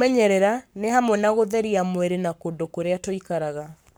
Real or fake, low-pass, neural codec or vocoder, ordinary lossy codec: fake; none; codec, 44.1 kHz, 7.8 kbps, Pupu-Codec; none